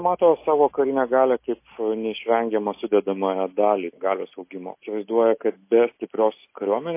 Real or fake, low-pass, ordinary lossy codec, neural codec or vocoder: real; 3.6 kHz; MP3, 24 kbps; none